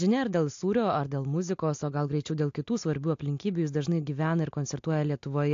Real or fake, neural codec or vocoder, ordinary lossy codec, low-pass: real; none; AAC, 48 kbps; 7.2 kHz